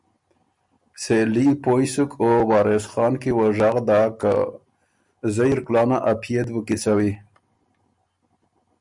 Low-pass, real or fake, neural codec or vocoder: 10.8 kHz; real; none